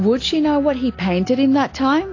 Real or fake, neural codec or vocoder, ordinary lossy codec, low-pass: real; none; AAC, 32 kbps; 7.2 kHz